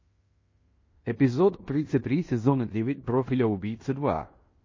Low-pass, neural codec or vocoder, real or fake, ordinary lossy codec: 7.2 kHz; codec, 16 kHz in and 24 kHz out, 0.9 kbps, LongCat-Audio-Codec, fine tuned four codebook decoder; fake; MP3, 32 kbps